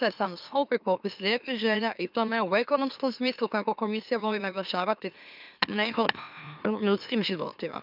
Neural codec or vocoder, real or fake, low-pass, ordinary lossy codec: autoencoder, 44.1 kHz, a latent of 192 numbers a frame, MeloTTS; fake; 5.4 kHz; none